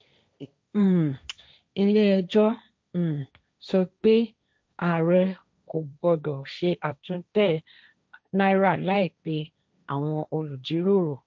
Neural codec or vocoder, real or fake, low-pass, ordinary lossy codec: codec, 16 kHz, 1.1 kbps, Voila-Tokenizer; fake; none; none